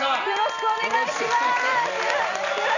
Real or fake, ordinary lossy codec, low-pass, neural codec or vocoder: fake; none; 7.2 kHz; vocoder, 44.1 kHz, 128 mel bands every 256 samples, BigVGAN v2